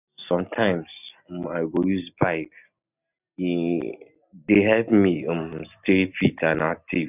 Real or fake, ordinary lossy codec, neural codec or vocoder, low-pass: real; none; none; 3.6 kHz